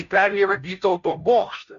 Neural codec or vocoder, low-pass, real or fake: codec, 16 kHz, 0.5 kbps, FunCodec, trained on Chinese and English, 25 frames a second; 7.2 kHz; fake